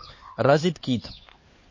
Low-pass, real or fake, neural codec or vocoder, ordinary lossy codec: 7.2 kHz; fake; codec, 16 kHz, 4 kbps, X-Codec, HuBERT features, trained on LibriSpeech; MP3, 32 kbps